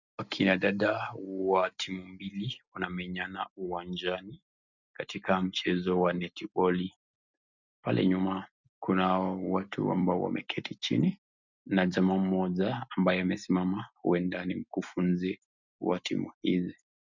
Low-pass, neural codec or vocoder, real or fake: 7.2 kHz; none; real